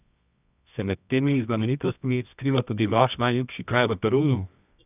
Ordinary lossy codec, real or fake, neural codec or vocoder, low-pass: none; fake; codec, 24 kHz, 0.9 kbps, WavTokenizer, medium music audio release; 3.6 kHz